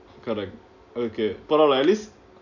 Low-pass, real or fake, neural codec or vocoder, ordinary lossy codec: 7.2 kHz; real; none; none